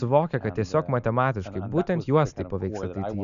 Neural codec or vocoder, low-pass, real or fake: none; 7.2 kHz; real